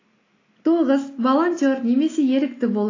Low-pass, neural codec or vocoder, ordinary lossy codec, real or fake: 7.2 kHz; none; AAC, 32 kbps; real